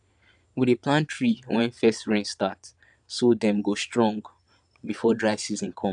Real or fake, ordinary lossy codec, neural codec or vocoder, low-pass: fake; none; vocoder, 22.05 kHz, 80 mel bands, Vocos; 9.9 kHz